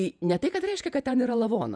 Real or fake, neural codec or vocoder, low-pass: fake; vocoder, 48 kHz, 128 mel bands, Vocos; 9.9 kHz